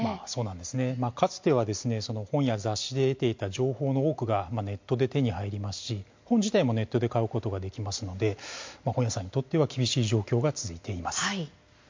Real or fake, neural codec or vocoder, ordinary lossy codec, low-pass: real; none; MP3, 48 kbps; 7.2 kHz